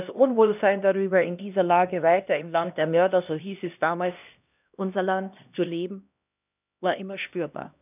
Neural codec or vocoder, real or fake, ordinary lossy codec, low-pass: codec, 16 kHz, 1 kbps, X-Codec, HuBERT features, trained on LibriSpeech; fake; none; 3.6 kHz